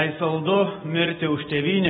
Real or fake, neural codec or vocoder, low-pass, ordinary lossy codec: real; none; 7.2 kHz; AAC, 16 kbps